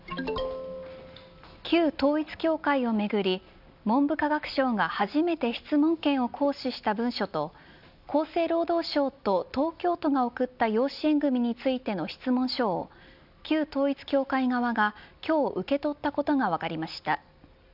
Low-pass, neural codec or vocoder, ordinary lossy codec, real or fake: 5.4 kHz; none; none; real